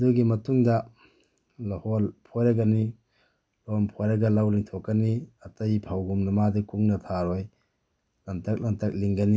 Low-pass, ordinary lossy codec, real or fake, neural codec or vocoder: none; none; real; none